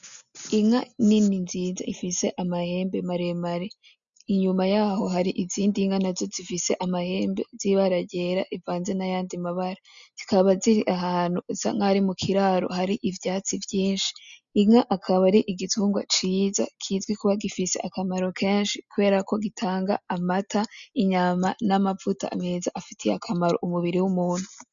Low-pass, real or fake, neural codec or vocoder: 7.2 kHz; real; none